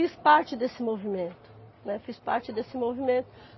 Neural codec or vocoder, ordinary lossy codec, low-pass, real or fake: none; MP3, 24 kbps; 7.2 kHz; real